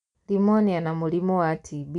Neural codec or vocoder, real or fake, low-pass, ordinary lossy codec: none; real; 10.8 kHz; none